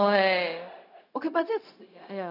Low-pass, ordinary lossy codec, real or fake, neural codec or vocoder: 5.4 kHz; none; fake; codec, 16 kHz, 0.4 kbps, LongCat-Audio-Codec